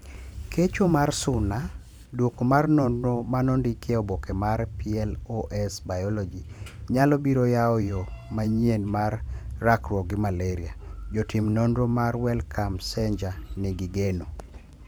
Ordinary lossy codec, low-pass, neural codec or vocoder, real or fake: none; none; vocoder, 44.1 kHz, 128 mel bands every 512 samples, BigVGAN v2; fake